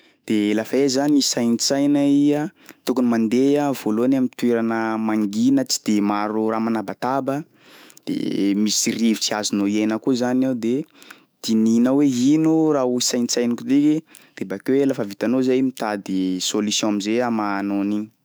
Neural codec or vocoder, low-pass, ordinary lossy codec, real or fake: autoencoder, 48 kHz, 128 numbers a frame, DAC-VAE, trained on Japanese speech; none; none; fake